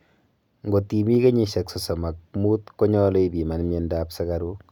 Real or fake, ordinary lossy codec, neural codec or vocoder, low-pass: real; none; none; 19.8 kHz